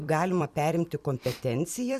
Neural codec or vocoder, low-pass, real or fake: vocoder, 44.1 kHz, 128 mel bands every 256 samples, BigVGAN v2; 14.4 kHz; fake